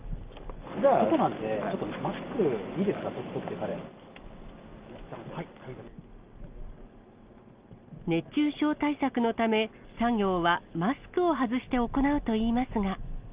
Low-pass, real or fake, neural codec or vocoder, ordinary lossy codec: 3.6 kHz; real; none; Opus, 24 kbps